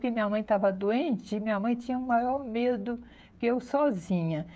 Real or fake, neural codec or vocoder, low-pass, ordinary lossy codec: fake; codec, 16 kHz, 16 kbps, FreqCodec, smaller model; none; none